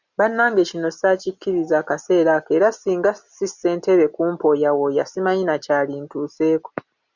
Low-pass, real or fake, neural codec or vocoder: 7.2 kHz; real; none